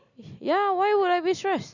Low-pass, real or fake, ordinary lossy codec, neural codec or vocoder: 7.2 kHz; real; none; none